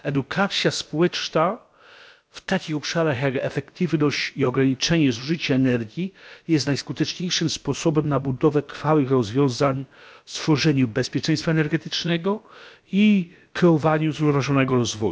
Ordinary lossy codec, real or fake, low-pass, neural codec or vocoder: none; fake; none; codec, 16 kHz, about 1 kbps, DyCAST, with the encoder's durations